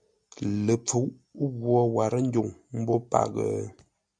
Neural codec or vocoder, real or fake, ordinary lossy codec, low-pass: none; real; MP3, 96 kbps; 9.9 kHz